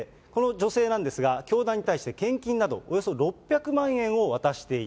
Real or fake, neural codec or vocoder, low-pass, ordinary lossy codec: real; none; none; none